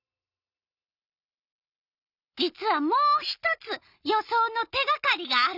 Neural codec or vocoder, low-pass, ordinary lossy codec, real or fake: none; 5.4 kHz; none; real